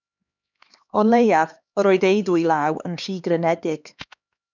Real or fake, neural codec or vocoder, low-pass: fake; codec, 16 kHz, 2 kbps, X-Codec, HuBERT features, trained on LibriSpeech; 7.2 kHz